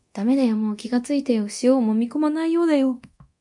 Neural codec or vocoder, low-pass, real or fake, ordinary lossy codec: codec, 24 kHz, 0.9 kbps, DualCodec; 10.8 kHz; fake; MP3, 64 kbps